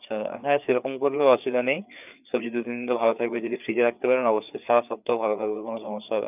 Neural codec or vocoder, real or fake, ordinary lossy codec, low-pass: codec, 16 kHz, 4 kbps, FreqCodec, larger model; fake; none; 3.6 kHz